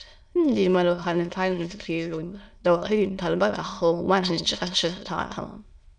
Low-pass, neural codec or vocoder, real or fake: 9.9 kHz; autoencoder, 22.05 kHz, a latent of 192 numbers a frame, VITS, trained on many speakers; fake